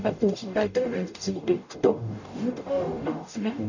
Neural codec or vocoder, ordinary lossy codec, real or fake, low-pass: codec, 44.1 kHz, 0.9 kbps, DAC; none; fake; 7.2 kHz